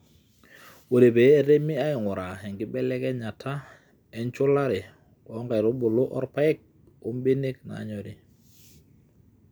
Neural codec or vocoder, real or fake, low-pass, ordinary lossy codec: none; real; none; none